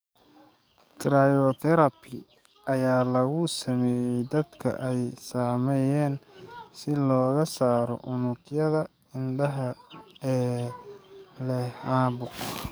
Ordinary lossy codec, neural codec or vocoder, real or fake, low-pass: none; codec, 44.1 kHz, 7.8 kbps, DAC; fake; none